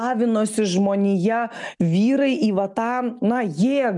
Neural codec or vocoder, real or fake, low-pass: none; real; 10.8 kHz